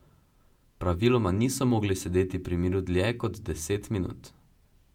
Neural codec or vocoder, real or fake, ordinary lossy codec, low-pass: vocoder, 48 kHz, 128 mel bands, Vocos; fake; MP3, 96 kbps; 19.8 kHz